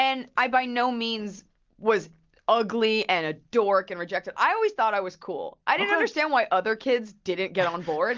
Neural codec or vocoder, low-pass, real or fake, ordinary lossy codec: none; 7.2 kHz; real; Opus, 32 kbps